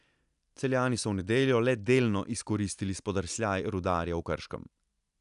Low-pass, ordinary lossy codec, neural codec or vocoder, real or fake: 10.8 kHz; none; none; real